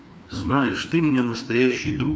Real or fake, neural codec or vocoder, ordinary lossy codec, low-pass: fake; codec, 16 kHz, 2 kbps, FreqCodec, larger model; none; none